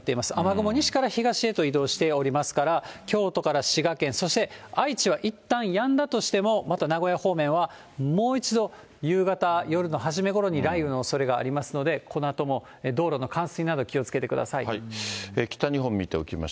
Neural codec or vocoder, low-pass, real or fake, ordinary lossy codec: none; none; real; none